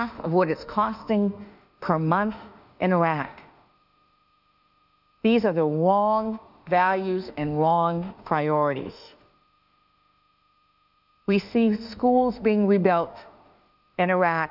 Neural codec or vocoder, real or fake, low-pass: autoencoder, 48 kHz, 32 numbers a frame, DAC-VAE, trained on Japanese speech; fake; 5.4 kHz